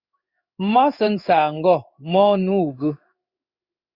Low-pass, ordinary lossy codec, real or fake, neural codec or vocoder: 5.4 kHz; Opus, 64 kbps; fake; codec, 16 kHz in and 24 kHz out, 1 kbps, XY-Tokenizer